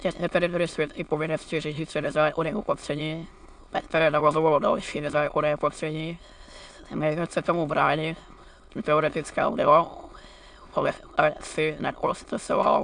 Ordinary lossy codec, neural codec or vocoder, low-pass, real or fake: AAC, 64 kbps; autoencoder, 22.05 kHz, a latent of 192 numbers a frame, VITS, trained on many speakers; 9.9 kHz; fake